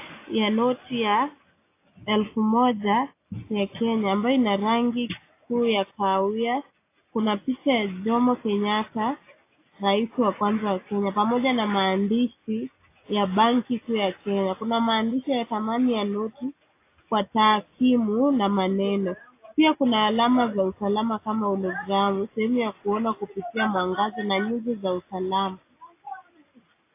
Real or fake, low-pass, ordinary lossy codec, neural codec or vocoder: real; 3.6 kHz; AAC, 24 kbps; none